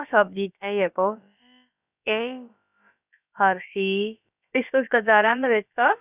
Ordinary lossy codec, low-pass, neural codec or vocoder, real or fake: none; 3.6 kHz; codec, 16 kHz, about 1 kbps, DyCAST, with the encoder's durations; fake